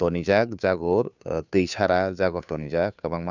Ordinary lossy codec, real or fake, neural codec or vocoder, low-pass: none; fake; codec, 16 kHz, 2 kbps, FunCodec, trained on Chinese and English, 25 frames a second; 7.2 kHz